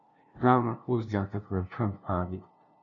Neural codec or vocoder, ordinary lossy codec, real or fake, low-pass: codec, 16 kHz, 0.5 kbps, FunCodec, trained on LibriTTS, 25 frames a second; AAC, 64 kbps; fake; 7.2 kHz